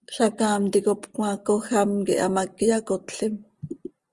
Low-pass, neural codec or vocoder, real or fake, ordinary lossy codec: 10.8 kHz; none; real; Opus, 32 kbps